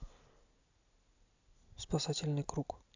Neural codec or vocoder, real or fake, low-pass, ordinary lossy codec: none; real; 7.2 kHz; AAC, 48 kbps